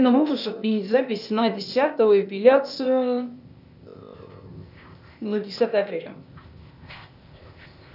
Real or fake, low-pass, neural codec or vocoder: fake; 5.4 kHz; codec, 16 kHz, 0.8 kbps, ZipCodec